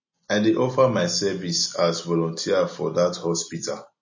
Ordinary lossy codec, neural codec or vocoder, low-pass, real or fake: MP3, 32 kbps; none; 7.2 kHz; real